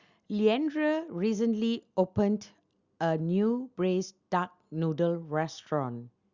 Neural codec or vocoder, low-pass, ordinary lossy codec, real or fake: none; 7.2 kHz; Opus, 64 kbps; real